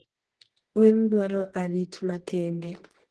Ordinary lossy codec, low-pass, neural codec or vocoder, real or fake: Opus, 16 kbps; 10.8 kHz; codec, 24 kHz, 0.9 kbps, WavTokenizer, medium music audio release; fake